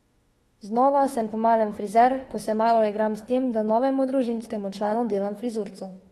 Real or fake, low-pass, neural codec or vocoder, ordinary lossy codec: fake; 19.8 kHz; autoencoder, 48 kHz, 32 numbers a frame, DAC-VAE, trained on Japanese speech; AAC, 32 kbps